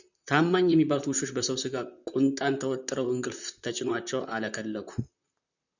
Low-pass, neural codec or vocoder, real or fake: 7.2 kHz; vocoder, 44.1 kHz, 128 mel bands, Pupu-Vocoder; fake